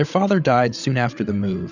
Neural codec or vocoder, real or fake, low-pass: codec, 16 kHz, 8 kbps, FreqCodec, larger model; fake; 7.2 kHz